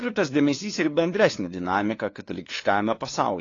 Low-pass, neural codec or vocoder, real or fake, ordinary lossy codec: 7.2 kHz; codec, 16 kHz, 2 kbps, FunCodec, trained on LibriTTS, 25 frames a second; fake; AAC, 32 kbps